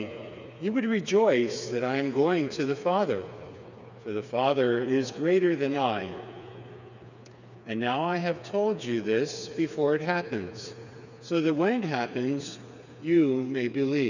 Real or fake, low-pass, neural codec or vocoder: fake; 7.2 kHz; codec, 16 kHz, 4 kbps, FreqCodec, smaller model